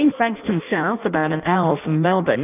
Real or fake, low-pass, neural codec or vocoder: fake; 3.6 kHz; codec, 16 kHz in and 24 kHz out, 0.6 kbps, FireRedTTS-2 codec